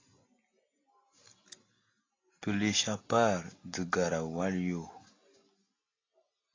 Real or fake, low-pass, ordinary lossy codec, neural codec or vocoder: real; 7.2 kHz; AAC, 32 kbps; none